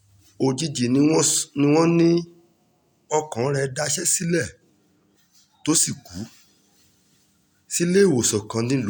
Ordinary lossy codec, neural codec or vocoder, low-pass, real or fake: none; none; none; real